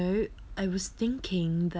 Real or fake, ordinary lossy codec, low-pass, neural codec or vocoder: real; none; none; none